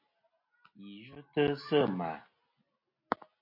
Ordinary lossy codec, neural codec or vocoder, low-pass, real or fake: MP3, 32 kbps; none; 5.4 kHz; real